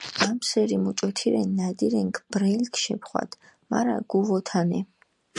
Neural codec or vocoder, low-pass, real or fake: none; 10.8 kHz; real